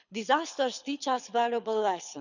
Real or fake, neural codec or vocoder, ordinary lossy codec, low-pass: fake; codec, 24 kHz, 6 kbps, HILCodec; none; 7.2 kHz